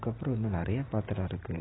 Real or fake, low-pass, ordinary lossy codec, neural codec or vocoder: real; 7.2 kHz; AAC, 16 kbps; none